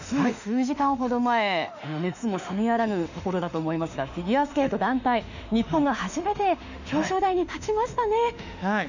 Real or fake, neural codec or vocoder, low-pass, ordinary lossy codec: fake; autoencoder, 48 kHz, 32 numbers a frame, DAC-VAE, trained on Japanese speech; 7.2 kHz; none